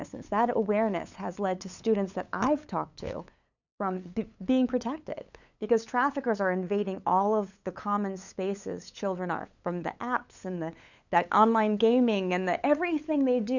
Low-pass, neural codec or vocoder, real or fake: 7.2 kHz; codec, 16 kHz, 8 kbps, FunCodec, trained on LibriTTS, 25 frames a second; fake